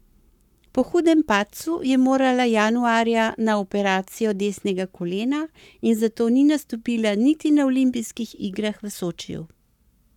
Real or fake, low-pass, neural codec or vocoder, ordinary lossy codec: fake; 19.8 kHz; codec, 44.1 kHz, 7.8 kbps, Pupu-Codec; none